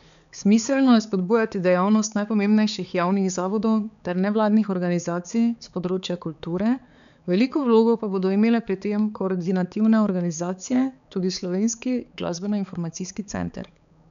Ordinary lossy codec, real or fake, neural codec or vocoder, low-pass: none; fake; codec, 16 kHz, 4 kbps, X-Codec, HuBERT features, trained on balanced general audio; 7.2 kHz